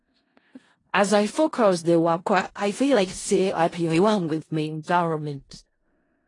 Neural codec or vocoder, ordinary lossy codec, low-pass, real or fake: codec, 16 kHz in and 24 kHz out, 0.4 kbps, LongCat-Audio-Codec, four codebook decoder; AAC, 32 kbps; 10.8 kHz; fake